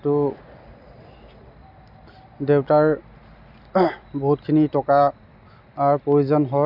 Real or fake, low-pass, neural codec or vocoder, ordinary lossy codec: real; 5.4 kHz; none; none